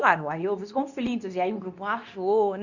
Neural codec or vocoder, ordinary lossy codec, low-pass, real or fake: codec, 24 kHz, 0.9 kbps, WavTokenizer, medium speech release version 2; none; 7.2 kHz; fake